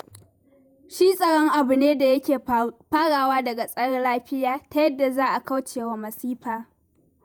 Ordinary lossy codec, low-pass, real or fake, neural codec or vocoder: none; none; fake; vocoder, 48 kHz, 128 mel bands, Vocos